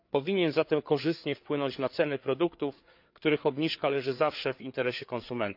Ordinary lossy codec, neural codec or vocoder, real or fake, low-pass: AAC, 48 kbps; codec, 16 kHz, 4 kbps, FreqCodec, larger model; fake; 5.4 kHz